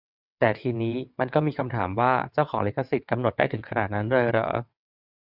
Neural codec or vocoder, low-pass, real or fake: vocoder, 22.05 kHz, 80 mel bands, WaveNeXt; 5.4 kHz; fake